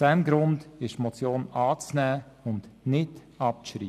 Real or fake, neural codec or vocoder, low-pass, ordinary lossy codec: real; none; 14.4 kHz; none